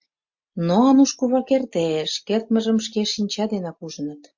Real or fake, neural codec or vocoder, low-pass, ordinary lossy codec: real; none; 7.2 kHz; MP3, 48 kbps